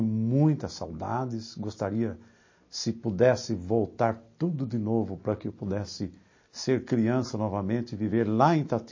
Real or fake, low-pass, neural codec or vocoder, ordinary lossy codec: real; 7.2 kHz; none; MP3, 32 kbps